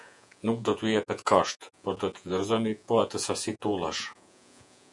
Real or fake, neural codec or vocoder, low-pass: fake; vocoder, 48 kHz, 128 mel bands, Vocos; 10.8 kHz